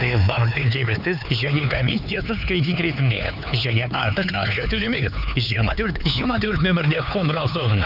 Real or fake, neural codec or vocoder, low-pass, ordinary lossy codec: fake; codec, 16 kHz, 4 kbps, X-Codec, HuBERT features, trained on LibriSpeech; 5.4 kHz; none